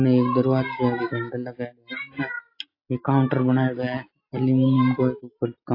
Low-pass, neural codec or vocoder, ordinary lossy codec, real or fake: 5.4 kHz; none; AAC, 32 kbps; real